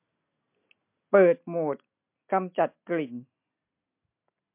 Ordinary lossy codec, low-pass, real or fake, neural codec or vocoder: MP3, 32 kbps; 3.6 kHz; real; none